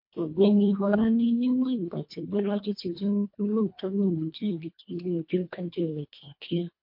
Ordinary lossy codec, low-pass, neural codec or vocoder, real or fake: MP3, 32 kbps; 5.4 kHz; codec, 24 kHz, 1.5 kbps, HILCodec; fake